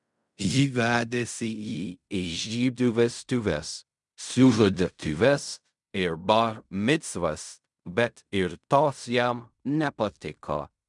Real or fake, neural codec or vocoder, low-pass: fake; codec, 16 kHz in and 24 kHz out, 0.4 kbps, LongCat-Audio-Codec, fine tuned four codebook decoder; 10.8 kHz